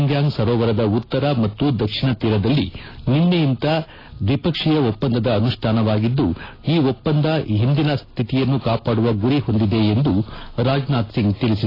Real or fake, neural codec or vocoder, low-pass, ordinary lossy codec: real; none; 5.4 kHz; AAC, 24 kbps